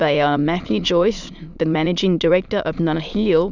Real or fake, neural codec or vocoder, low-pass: fake; autoencoder, 22.05 kHz, a latent of 192 numbers a frame, VITS, trained on many speakers; 7.2 kHz